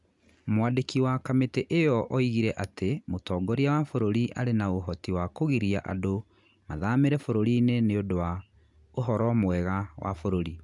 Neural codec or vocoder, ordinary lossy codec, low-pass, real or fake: none; none; 10.8 kHz; real